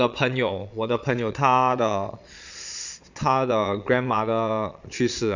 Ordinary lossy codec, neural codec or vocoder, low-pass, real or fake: none; vocoder, 22.05 kHz, 80 mel bands, Vocos; 7.2 kHz; fake